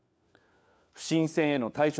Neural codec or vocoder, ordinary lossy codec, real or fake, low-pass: codec, 16 kHz, 4 kbps, FunCodec, trained on LibriTTS, 50 frames a second; none; fake; none